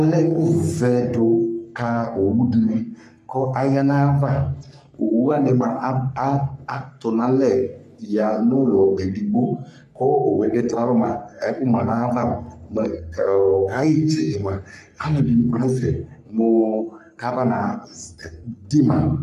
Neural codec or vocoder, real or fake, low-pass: codec, 44.1 kHz, 3.4 kbps, Pupu-Codec; fake; 14.4 kHz